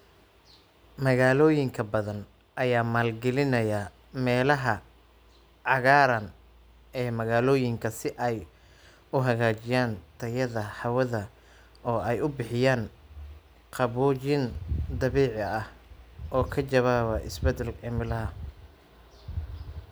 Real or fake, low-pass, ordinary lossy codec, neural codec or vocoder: real; none; none; none